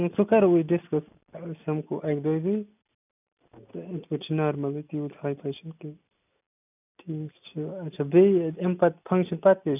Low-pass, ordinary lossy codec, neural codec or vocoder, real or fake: 3.6 kHz; none; none; real